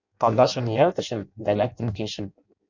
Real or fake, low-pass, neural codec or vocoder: fake; 7.2 kHz; codec, 16 kHz in and 24 kHz out, 0.6 kbps, FireRedTTS-2 codec